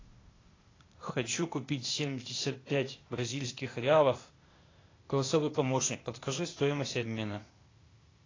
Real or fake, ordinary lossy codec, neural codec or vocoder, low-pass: fake; AAC, 32 kbps; codec, 16 kHz, 0.8 kbps, ZipCodec; 7.2 kHz